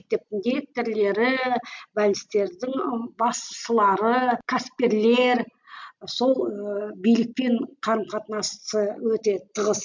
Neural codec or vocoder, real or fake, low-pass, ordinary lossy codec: none; real; 7.2 kHz; MP3, 64 kbps